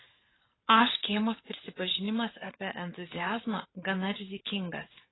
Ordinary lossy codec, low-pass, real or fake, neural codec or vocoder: AAC, 16 kbps; 7.2 kHz; fake; codec, 44.1 kHz, 7.8 kbps, Pupu-Codec